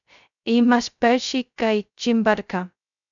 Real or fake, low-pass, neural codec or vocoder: fake; 7.2 kHz; codec, 16 kHz, 0.2 kbps, FocalCodec